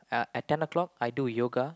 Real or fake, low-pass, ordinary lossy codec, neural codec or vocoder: real; none; none; none